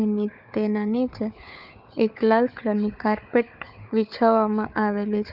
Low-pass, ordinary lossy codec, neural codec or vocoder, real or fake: 5.4 kHz; none; codec, 16 kHz, 4 kbps, FunCodec, trained on Chinese and English, 50 frames a second; fake